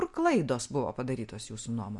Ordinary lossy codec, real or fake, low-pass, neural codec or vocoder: MP3, 64 kbps; real; 10.8 kHz; none